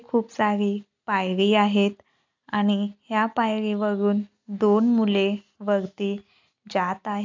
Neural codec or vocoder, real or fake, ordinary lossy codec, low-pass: none; real; AAC, 48 kbps; 7.2 kHz